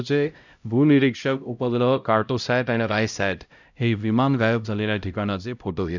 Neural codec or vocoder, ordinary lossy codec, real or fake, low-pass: codec, 16 kHz, 0.5 kbps, X-Codec, HuBERT features, trained on LibriSpeech; none; fake; 7.2 kHz